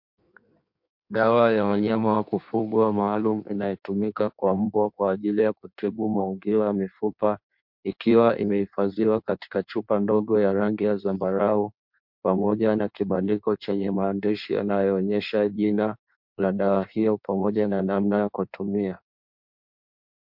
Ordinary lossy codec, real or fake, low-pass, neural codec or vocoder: MP3, 48 kbps; fake; 5.4 kHz; codec, 16 kHz in and 24 kHz out, 1.1 kbps, FireRedTTS-2 codec